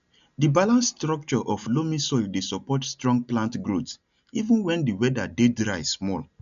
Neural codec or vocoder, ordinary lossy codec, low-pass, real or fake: none; none; 7.2 kHz; real